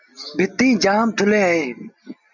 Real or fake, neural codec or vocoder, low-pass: real; none; 7.2 kHz